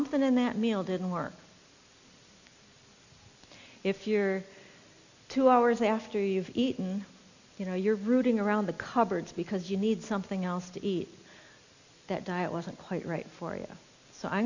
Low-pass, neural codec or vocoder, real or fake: 7.2 kHz; none; real